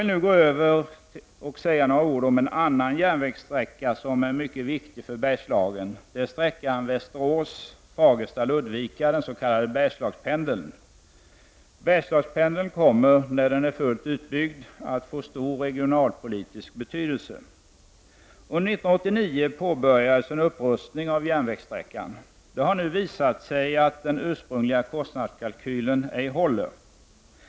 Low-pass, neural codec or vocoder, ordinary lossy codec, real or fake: none; none; none; real